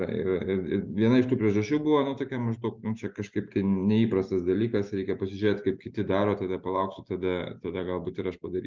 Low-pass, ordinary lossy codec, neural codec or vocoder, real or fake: 7.2 kHz; Opus, 24 kbps; none; real